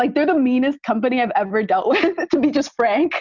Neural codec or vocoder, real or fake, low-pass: none; real; 7.2 kHz